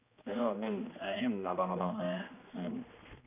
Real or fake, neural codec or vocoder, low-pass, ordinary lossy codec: fake; codec, 16 kHz, 2 kbps, X-Codec, HuBERT features, trained on general audio; 3.6 kHz; none